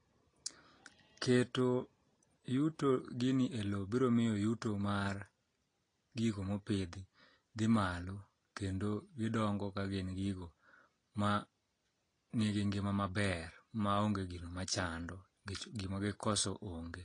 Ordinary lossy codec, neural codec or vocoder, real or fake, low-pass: AAC, 32 kbps; none; real; 9.9 kHz